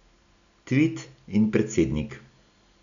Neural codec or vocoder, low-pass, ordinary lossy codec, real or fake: none; 7.2 kHz; none; real